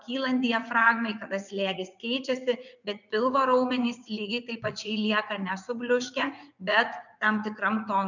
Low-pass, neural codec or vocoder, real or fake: 7.2 kHz; vocoder, 44.1 kHz, 80 mel bands, Vocos; fake